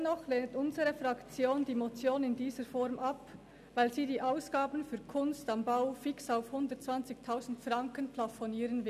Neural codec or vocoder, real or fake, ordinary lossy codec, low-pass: none; real; none; 14.4 kHz